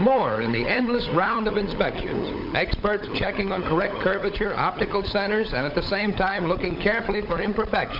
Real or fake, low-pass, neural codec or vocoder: fake; 5.4 kHz; codec, 16 kHz, 16 kbps, FunCodec, trained on LibriTTS, 50 frames a second